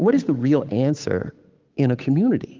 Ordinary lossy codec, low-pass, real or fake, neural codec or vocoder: Opus, 24 kbps; 7.2 kHz; fake; codec, 16 kHz, 8 kbps, FunCodec, trained on Chinese and English, 25 frames a second